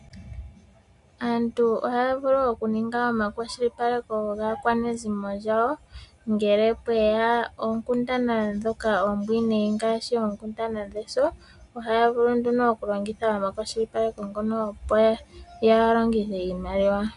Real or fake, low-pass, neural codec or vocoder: real; 10.8 kHz; none